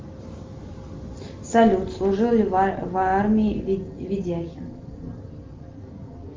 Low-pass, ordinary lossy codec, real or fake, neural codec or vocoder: 7.2 kHz; Opus, 32 kbps; real; none